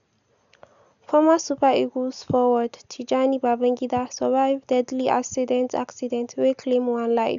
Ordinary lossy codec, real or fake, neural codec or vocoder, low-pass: none; real; none; 7.2 kHz